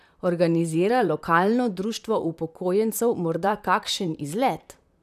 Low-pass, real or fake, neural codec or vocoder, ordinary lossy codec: 14.4 kHz; fake; vocoder, 44.1 kHz, 128 mel bands, Pupu-Vocoder; none